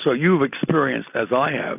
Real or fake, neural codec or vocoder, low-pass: real; none; 3.6 kHz